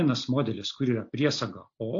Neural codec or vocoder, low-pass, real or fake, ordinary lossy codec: none; 7.2 kHz; real; AAC, 48 kbps